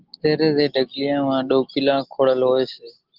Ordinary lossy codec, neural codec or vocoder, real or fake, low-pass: Opus, 16 kbps; none; real; 5.4 kHz